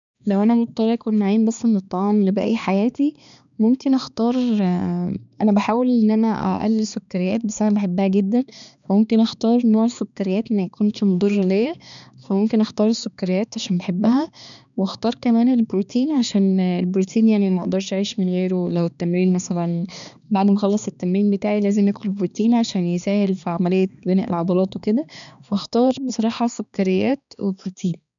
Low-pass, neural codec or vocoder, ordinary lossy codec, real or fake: 7.2 kHz; codec, 16 kHz, 2 kbps, X-Codec, HuBERT features, trained on balanced general audio; none; fake